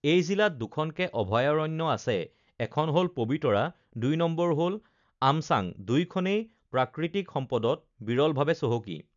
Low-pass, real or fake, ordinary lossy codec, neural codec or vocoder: 7.2 kHz; real; none; none